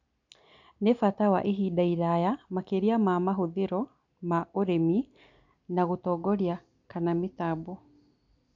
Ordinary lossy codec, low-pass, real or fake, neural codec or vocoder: none; 7.2 kHz; real; none